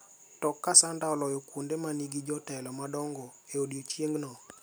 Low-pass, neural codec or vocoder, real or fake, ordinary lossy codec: none; none; real; none